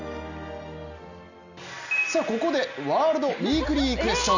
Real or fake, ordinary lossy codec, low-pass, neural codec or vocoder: real; none; 7.2 kHz; none